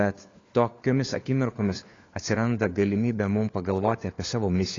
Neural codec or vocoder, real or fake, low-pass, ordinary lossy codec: codec, 16 kHz, 4 kbps, FunCodec, trained on LibriTTS, 50 frames a second; fake; 7.2 kHz; AAC, 32 kbps